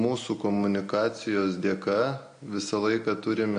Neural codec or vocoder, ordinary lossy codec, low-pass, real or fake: none; MP3, 48 kbps; 9.9 kHz; real